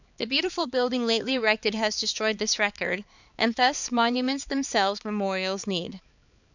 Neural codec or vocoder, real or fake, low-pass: codec, 16 kHz, 4 kbps, X-Codec, HuBERT features, trained on balanced general audio; fake; 7.2 kHz